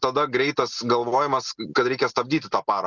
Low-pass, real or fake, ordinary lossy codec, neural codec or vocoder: 7.2 kHz; real; Opus, 64 kbps; none